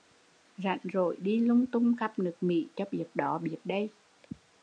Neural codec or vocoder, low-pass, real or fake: none; 9.9 kHz; real